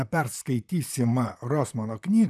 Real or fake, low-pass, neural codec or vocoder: fake; 14.4 kHz; vocoder, 44.1 kHz, 128 mel bands, Pupu-Vocoder